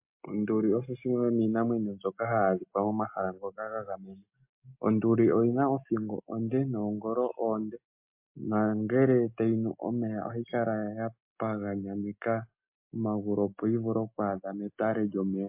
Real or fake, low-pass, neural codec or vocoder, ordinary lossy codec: real; 3.6 kHz; none; MP3, 32 kbps